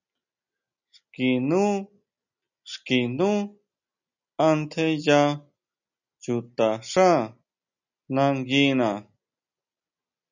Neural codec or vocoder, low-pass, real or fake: none; 7.2 kHz; real